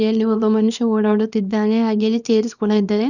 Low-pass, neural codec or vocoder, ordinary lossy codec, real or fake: 7.2 kHz; codec, 24 kHz, 0.9 kbps, WavTokenizer, small release; none; fake